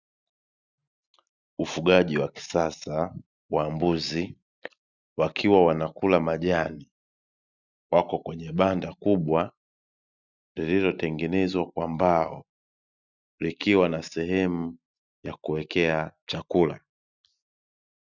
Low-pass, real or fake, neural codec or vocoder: 7.2 kHz; real; none